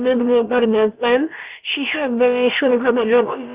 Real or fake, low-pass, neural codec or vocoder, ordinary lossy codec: fake; 3.6 kHz; codec, 16 kHz, about 1 kbps, DyCAST, with the encoder's durations; Opus, 32 kbps